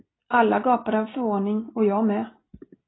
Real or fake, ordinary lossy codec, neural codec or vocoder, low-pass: real; AAC, 16 kbps; none; 7.2 kHz